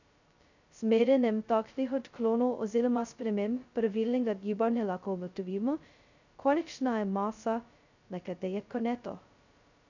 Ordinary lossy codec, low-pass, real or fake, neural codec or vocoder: none; 7.2 kHz; fake; codec, 16 kHz, 0.2 kbps, FocalCodec